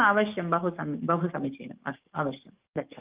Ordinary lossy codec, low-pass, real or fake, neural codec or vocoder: Opus, 32 kbps; 3.6 kHz; fake; codec, 44.1 kHz, 7.8 kbps, DAC